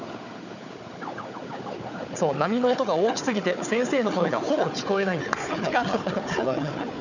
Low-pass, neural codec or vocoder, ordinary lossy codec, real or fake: 7.2 kHz; codec, 16 kHz, 4 kbps, FunCodec, trained on Chinese and English, 50 frames a second; none; fake